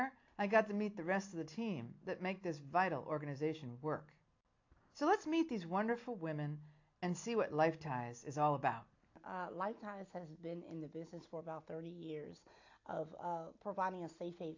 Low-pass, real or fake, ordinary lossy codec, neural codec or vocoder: 7.2 kHz; real; AAC, 48 kbps; none